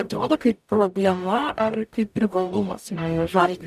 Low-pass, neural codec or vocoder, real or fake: 14.4 kHz; codec, 44.1 kHz, 0.9 kbps, DAC; fake